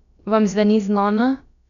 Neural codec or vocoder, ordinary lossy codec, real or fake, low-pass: codec, 16 kHz, about 1 kbps, DyCAST, with the encoder's durations; none; fake; 7.2 kHz